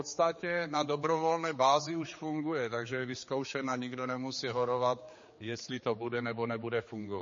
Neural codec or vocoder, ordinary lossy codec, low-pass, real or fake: codec, 16 kHz, 4 kbps, X-Codec, HuBERT features, trained on general audio; MP3, 32 kbps; 7.2 kHz; fake